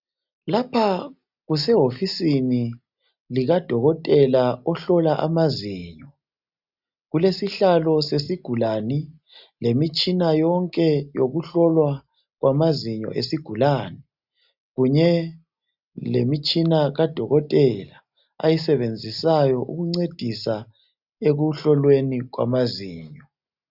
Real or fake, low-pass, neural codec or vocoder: real; 5.4 kHz; none